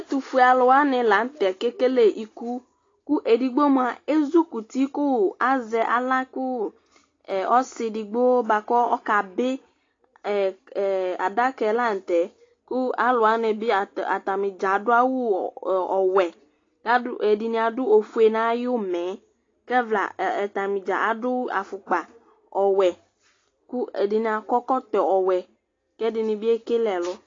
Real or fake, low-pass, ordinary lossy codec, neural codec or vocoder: real; 7.2 kHz; AAC, 32 kbps; none